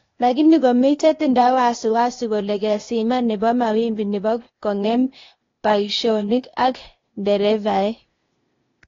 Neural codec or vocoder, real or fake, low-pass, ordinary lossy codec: codec, 16 kHz, 0.8 kbps, ZipCodec; fake; 7.2 kHz; AAC, 32 kbps